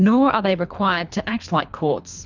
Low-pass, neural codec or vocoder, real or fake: 7.2 kHz; codec, 24 kHz, 3 kbps, HILCodec; fake